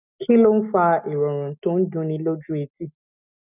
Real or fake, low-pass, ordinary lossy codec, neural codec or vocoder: real; 3.6 kHz; none; none